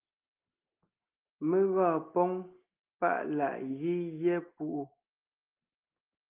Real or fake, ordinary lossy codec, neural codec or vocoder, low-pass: real; Opus, 24 kbps; none; 3.6 kHz